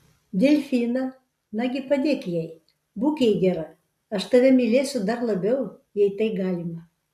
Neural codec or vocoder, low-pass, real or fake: none; 14.4 kHz; real